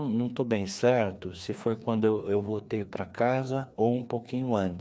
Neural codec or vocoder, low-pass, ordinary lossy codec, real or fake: codec, 16 kHz, 2 kbps, FreqCodec, larger model; none; none; fake